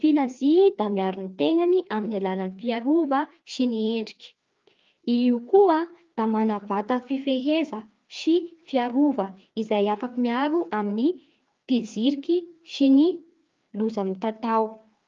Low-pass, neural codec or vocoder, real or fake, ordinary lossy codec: 7.2 kHz; codec, 16 kHz, 2 kbps, FreqCodec, larger model; fake; Opus, 32 kbps